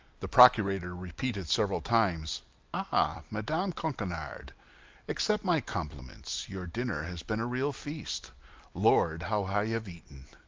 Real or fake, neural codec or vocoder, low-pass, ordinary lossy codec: real; none; 7.2 kHz; Opus, 24 kbps